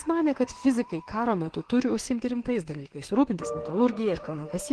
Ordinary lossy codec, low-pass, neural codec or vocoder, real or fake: Opus, 16 kbps; 10.8 kHz; autoencoder, 48 kHz, 32 numbers a frame, DAC-VAE, trained on Japanese speech; fake